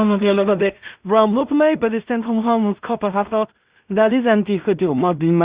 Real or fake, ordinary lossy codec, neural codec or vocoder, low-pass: fake; Opus, 64 kbps; codec, 16 kHz in and 24 kHz out, 0.4 kbps, LongCat-Audio-Codec, two codebook decoder; 3.6 kHz